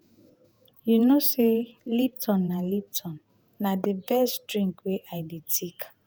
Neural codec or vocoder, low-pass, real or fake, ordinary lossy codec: vocoder, 48 kHz, 128 mel bands, Vocos; none; fake; none